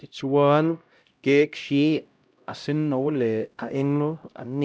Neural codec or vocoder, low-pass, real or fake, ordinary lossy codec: codec, 16 kHz, 0.5 kbps, X-Codec, HuBERT features, trained on LibriSpeech; none; fake; none